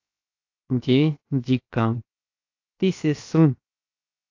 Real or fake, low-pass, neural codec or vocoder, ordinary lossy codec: fake; 7.2 kHz; codec, 16 kHz, 0.7 kbps, FocalCodec; AAC, 48 kbps